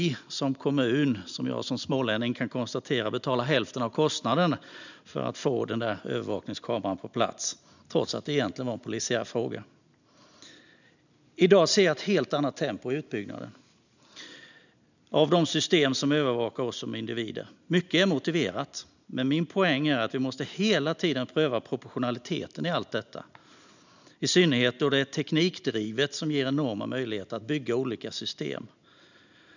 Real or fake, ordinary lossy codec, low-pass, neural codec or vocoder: real; none; 7.2 kHz; none